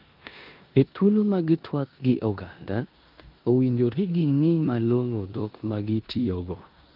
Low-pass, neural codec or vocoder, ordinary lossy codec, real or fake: 5.4 kHz; codec, 16 kHz in and 24 kHz out, 0.9 kbps, LongCat-Audio-Codec, four codebook decoder; Opus, 24 kbps; fake